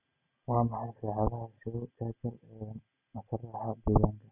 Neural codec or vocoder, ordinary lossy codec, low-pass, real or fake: none; none; 3.6 kHz; real